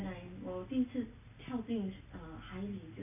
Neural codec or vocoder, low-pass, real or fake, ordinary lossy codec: none; 3.6 kHz; real; none